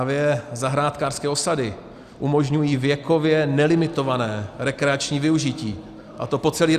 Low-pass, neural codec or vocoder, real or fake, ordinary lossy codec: 14.4 kHz; none; real; Opus, 64 kbps